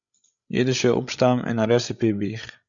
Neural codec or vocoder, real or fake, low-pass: codec, 16 kHz, 16 kbps, FreqCodec, larger model; fake; 7.2 kHz